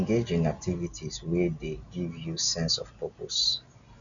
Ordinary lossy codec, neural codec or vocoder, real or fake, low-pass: none; none; real; 7.2 kHz